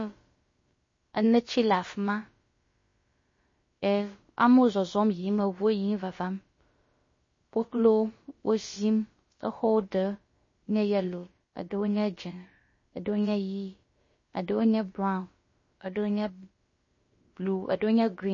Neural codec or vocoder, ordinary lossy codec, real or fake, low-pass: codec, 16 kHz, about 1 kbps, DyCAST, with the encoder's durations; MP3, 32 kbps; fake; 7.2 kHz